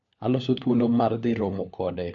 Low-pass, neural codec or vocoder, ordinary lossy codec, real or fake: 7.2 kHz; codec, 16 kHz, 4 kbps, FunCodec, trained on LibriTTS, 50 frames a second; AAC, 64 kbps; fake